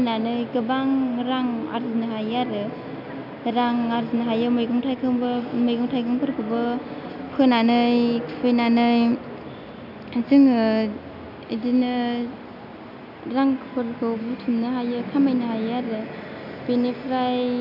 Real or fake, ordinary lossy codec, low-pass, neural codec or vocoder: real; none; 5.4 kHz; none